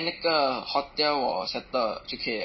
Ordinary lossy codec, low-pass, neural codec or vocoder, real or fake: MP3, 24 kbps; 7.2 kHz; none; real